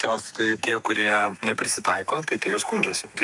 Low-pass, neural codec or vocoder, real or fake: 10.8 kHz; codec, 32 kHz, 1.9 kbps, SNAC; fake